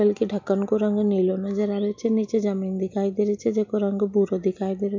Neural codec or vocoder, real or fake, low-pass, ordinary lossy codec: none; real; 7.2 kHz; MP3, 48 kbps